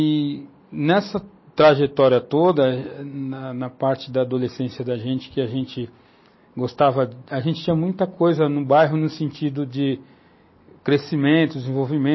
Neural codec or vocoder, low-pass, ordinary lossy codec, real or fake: none; 7.2 kHz; MP3, 24 kbps; real